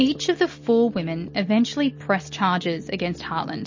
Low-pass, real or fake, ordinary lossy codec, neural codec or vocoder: 7.2 kHz; real; MP3, 32 kbps; none